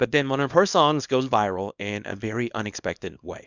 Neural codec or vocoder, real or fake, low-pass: codec, 24 kHz, 0.9 kbps, WavTokenizer, small release; fake; 7.2 kHz